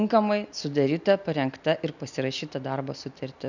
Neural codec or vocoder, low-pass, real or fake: none; 7.2 kHz; real